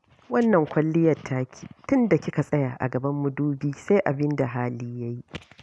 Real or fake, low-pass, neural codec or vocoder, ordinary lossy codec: real; none; none; none